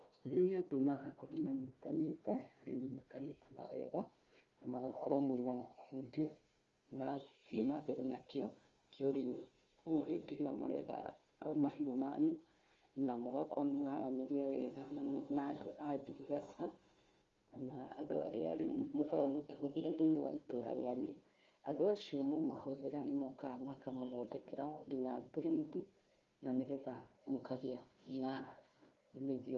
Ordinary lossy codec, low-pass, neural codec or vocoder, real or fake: Opus, 32 kbps; 7.2 kHz; codec, 16 kHz, 1 kbps, FunCodec, trained on Chinese and English, 50 frames a second; fake